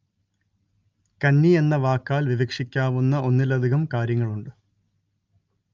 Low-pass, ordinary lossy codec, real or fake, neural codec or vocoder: 7.2 kHz; Opus, 24 kbps; real; none